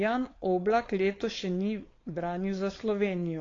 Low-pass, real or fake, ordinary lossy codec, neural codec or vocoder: 7.2 kHz; fake; AAC, 32 kbps; codec, 16 kHz, 4.8 kbps, FACodec